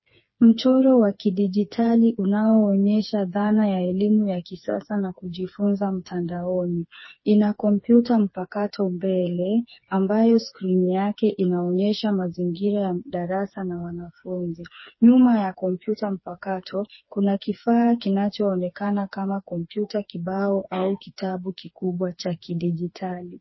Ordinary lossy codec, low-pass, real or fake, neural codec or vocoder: MP3, 24 kbps; 7.2 kHz; fake; codec, 16 kHz, 4 kbps, FreqCodec, smaller model